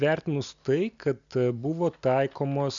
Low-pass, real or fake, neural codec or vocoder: 7.2 kHz; real; none